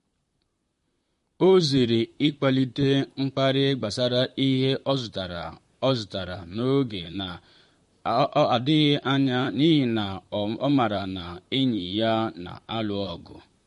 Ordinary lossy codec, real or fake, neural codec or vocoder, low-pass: MP3, 48 kbps; fake; vocoder, 44.1 kHz, 128 mel bands, Pupu-Vocoder; 14.4 kHz